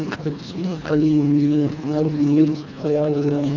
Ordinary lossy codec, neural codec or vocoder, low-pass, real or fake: none; codec, 24 kHz, 1.5 kbps, HILCodec; 7.2 kHz; fake